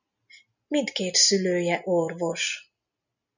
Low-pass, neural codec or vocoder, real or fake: 7.2 kHz; none; real